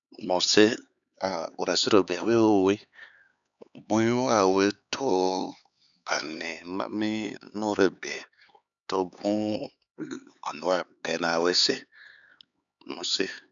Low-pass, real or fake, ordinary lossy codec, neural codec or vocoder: 7.2 kHz; fake; none; codec, 16 kHz, 4 kbps, X-Codec, HuBERT features, trained on LibriSpeech